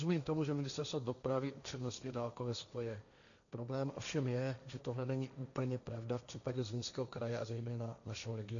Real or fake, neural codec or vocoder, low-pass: fake; codec, 16 kHz, 1.1 kbps, Voila-Tokenizer; 7.2 kHz